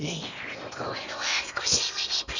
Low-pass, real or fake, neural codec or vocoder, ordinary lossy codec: 7.2 kHz; fake; codec, 16 kHz in and 24 kHz out, 0.8 kbps, FocalCodec, streaming, 65536 codes; none